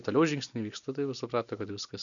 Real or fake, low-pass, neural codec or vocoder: real; 7.2 kHz; none